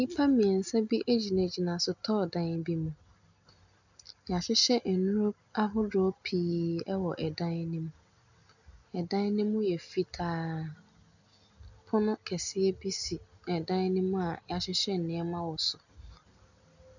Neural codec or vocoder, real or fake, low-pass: none; real; 7.2 kHz